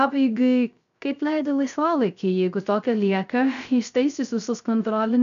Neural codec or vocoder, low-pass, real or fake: codec, 16 kHz, 0.3 kbps, FocalCodec; 7.2 kHz; fake